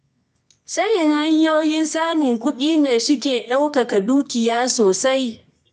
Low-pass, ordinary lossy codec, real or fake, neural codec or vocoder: 10.8 kHz; none; fake; codec, 24 kHz, 0.9 kbps, WavTokenizer, medium music audio release